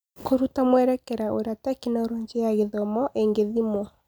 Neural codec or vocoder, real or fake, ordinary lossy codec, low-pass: none; real; none; none